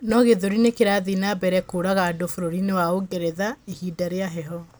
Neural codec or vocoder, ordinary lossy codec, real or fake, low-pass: none; none; real; none